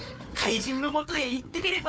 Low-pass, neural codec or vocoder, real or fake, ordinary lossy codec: none; codec, 16 kHz, 4 kbps, FunCodec, trained on Chinese and English, 50 frames a second; fake; none